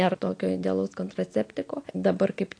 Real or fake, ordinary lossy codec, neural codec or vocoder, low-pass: real; AAC, 48 kbps; none; 9.9 kHz